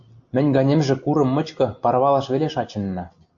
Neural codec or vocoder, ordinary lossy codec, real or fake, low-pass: none; AAC, 64 kbps; real; 7.2 kHz